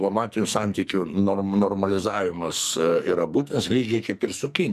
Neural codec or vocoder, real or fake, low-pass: codec, 44.1 kHz, 2.6 kbps, SNAC; fake; 14.4 kHz